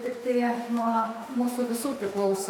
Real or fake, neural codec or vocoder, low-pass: fake; autoencoder, 48 kHz, 32 numbers a frame, DAC-VAE, trained on Japanese speech; 19.8 kHz